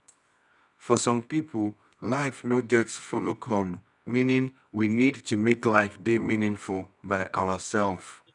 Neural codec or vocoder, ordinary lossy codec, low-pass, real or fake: codec, 24 kHz, 0.9 kbps, WavTokenizer, medium music audio release; none; 10.8 kHz; fake